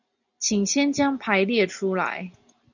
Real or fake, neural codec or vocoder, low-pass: real; none; 7.2 kHz